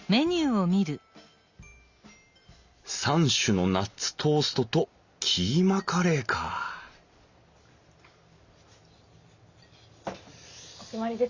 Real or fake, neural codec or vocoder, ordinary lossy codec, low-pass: real; none; Opus, 64 kbps; 7.2 kHz